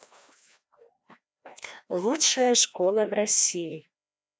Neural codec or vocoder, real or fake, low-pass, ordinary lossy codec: codec, 16 kHz, 1 kbps, FreqCodec, larger model; fake; none; none